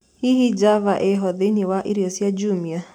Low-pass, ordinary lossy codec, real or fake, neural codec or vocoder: 19.8 kHz; none; fake; vocoder, 44.1 kHz, 128 mel bands every 256 samples, BigVGAN v2